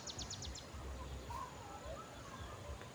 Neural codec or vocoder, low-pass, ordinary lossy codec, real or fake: none; none; none; real